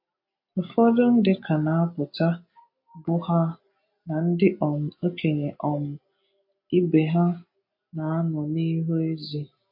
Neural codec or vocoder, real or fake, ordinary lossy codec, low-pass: none; real; MP3, 32 kbps; 5.4 kHz